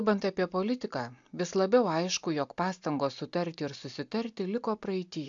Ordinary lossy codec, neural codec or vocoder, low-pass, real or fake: AAC, 64 kbps; none; 7.2 kHz; real